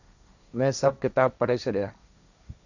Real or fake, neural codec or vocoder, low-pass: fake; codec, 16 kHz, 1.1 kbps, Voila-Tokenizer; 7.2 kHz